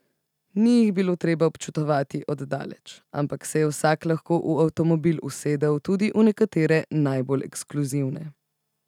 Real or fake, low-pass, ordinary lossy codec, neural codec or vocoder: real; 19.8 kHz; none; none